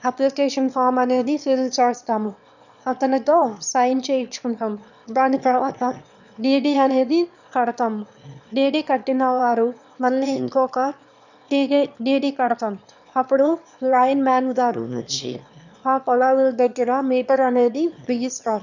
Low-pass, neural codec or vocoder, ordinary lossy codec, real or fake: 7.2 kHz; autoencoder, 22.05 kHz, a latent of 192 numbers a frame, VITS, trained on one speaker; none; fake